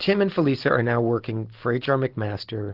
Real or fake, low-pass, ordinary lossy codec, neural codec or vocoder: real; 5.4 kHz; Opus, 16 kbps; none